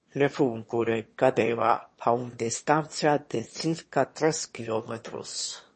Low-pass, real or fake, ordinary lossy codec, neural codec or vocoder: 9.9 kHz; fake; MP3, 32 kbps; autoencoder, 22.05 kHz, a latent of 192 numbers a frame, VITS, trained on one speaker